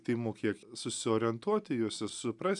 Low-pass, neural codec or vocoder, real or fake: 10.8 kHz; none; real